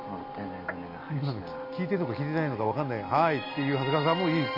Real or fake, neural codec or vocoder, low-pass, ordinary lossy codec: real; none; 5.4 kHz; MP3, 32 kbps